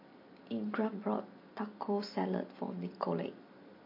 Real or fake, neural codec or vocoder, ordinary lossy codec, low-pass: real; none; MP3, 32 kbps; 5.4 kHz